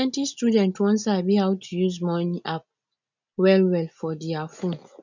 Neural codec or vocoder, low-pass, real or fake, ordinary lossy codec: none; 7.2 kHz; real; none